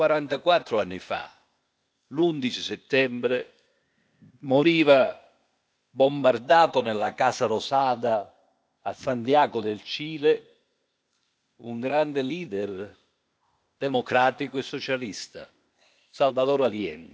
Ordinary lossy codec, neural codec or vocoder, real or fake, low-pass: none; codec, 16 kHz, 0.8 kbps, ZipCodec; fake; none